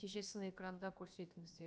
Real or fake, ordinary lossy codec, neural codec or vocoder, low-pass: fake; none; codec, 16 kHz, about 1 kbps, DyCAST, with the encoder's durations; none